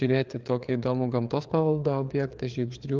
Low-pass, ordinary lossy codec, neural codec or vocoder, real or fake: 7.2 kHz; Opus, 32 kbps; codec, 16 kHz, 4 kbps, FreqCodec, larger model; fake